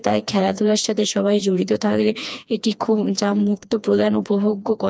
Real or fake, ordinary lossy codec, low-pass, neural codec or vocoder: fake; none; none; codec, 16 kHz, 2 kbps, FreqCodec, smaller model